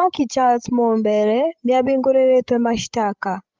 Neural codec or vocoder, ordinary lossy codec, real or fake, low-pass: codec, 16 kHz, 16 kbps, FreqCodec, larger model; Opus, 32 kbps; fake; 7.2 kHz